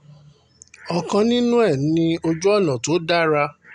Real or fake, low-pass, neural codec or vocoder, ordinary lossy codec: real; 10.8 kHz; none; none